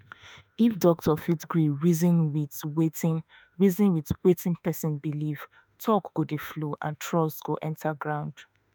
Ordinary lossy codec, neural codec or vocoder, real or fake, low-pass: none; autoencoder, 48 kHz, 32 numbers a frame, DAC-VAE, trained on Japanese speech; fake; none